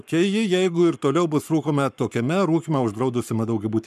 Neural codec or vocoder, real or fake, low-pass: codec, 44.1 kHz, 7.8 kbps, Pupu-Codec; fake; 14.4 kHz